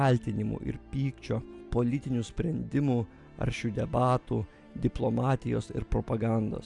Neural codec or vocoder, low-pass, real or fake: none; 10.8 kHz; real